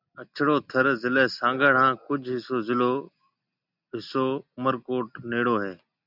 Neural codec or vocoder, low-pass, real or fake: none; 5.4 kHz; real